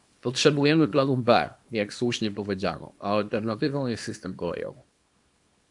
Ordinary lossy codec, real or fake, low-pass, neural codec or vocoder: AAC, 64 kbps; fake; 10.8 kHz; codec, 24 kHz, 0.9 kbps, WavTokenizer, small release